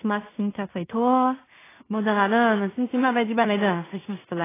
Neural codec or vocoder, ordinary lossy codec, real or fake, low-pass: codec, 16 kHz in and 24 kHz out, 0.4 kbps, LongCat-Audio-Codec, two codebook decoder; AAC, 16 kbps; fake; 3.6 kHz